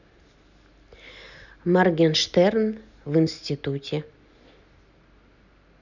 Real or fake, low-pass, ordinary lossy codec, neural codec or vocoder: real; 7.2 kHz; none; none